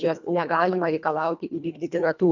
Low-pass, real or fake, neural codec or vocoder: 7.2 kHz; fake; codec, 24 kHz, 1.5 kbps, HILCodec